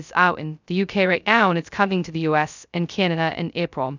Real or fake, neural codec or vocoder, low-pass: fake; codec, 16 kHz, 0.2 kbps, FocalCodec; 7.2 kHz